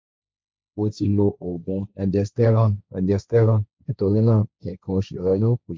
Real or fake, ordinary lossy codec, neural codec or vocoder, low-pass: fake; none; codec, 16 kHz, 1.1 kbps, Voila-Tokenizer; none